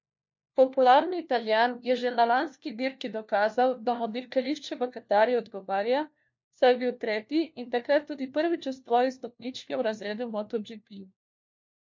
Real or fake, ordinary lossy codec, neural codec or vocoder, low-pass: fake; MP3, 48 kbps; codec, 16 kHz, 1 kbps, FunCodec, trained on LibriTTS, 50 frames a second; 7.2 kHz